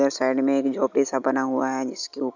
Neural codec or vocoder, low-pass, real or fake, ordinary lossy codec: none; 7.2 kHz; real; none